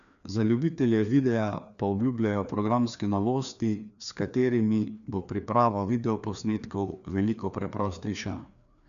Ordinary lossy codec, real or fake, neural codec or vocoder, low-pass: none; fake; codec, 16 kHz, 2 kbps, FreqCodec, larger model; 7.2 kHz